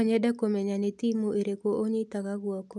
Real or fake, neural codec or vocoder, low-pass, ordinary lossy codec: real; none; none; none